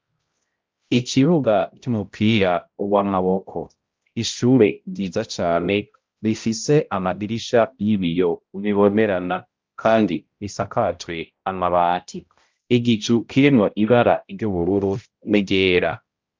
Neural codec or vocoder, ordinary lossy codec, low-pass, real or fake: codec, 16 kHz, 0.5 kbps, X-Codec, HuBERT features, trained on balanced general audio; Opus, 24 kbps; 7.2 kHz; fake